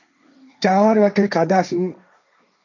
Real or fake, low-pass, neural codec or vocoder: fake; 7.2 kHz; codec, 16 kHz, 1.1 kbps, Voila-Tokenizer